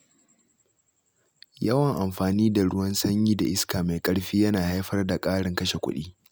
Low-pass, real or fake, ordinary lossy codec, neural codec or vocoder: none; real; none; none